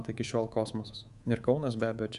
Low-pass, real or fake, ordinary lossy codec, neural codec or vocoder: 10.8 kHz; fake; Opus, 64 kbps; codec, 24 kHz, 3.1 kbps, DualCodec